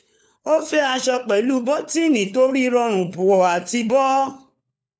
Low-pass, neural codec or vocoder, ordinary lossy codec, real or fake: none; codec, 16 kHz, 4 kbps, FunCodec, trained on LibriTTS, 50 frames a second; none; fake